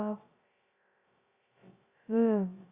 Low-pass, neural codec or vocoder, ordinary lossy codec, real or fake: 3.6 kHz; codec, 16 kHz, 0.2 kbps, FocalCodec; Opus, 64 kbps; fake